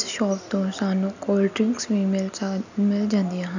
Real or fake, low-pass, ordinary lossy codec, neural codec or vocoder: real; 7.2 kHz; none; none